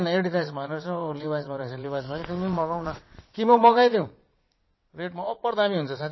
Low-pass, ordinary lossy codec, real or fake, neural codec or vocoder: 7.2 kHz; MP3, 24 kbps; fake; vocoder, 22.05 kHz, 80 mel bands, Vocos